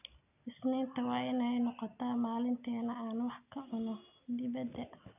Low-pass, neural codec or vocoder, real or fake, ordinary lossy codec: 3.6 kHz; none; real; none